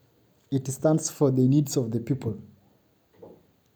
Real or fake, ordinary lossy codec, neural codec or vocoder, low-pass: real; none; none; none